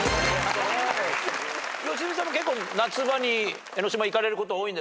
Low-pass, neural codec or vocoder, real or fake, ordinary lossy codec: none; none; real; none